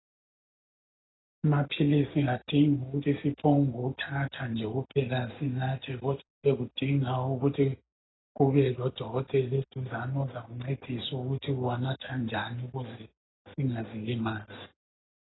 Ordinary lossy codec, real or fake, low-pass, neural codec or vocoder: AAC, 16 kbps; real; 7.2 kHz; none